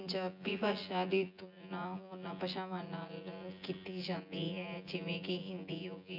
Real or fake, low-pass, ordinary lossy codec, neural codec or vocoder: fake; 5.4 kHz; none; vocoder, 24 kHz, 100 mel bands, Vocos